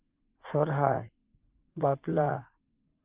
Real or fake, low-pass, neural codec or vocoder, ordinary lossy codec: fake; 3.6 kHz; vocoder, 44.1 kHz, 80 mel bands, Vocos; Opus, 32 kbps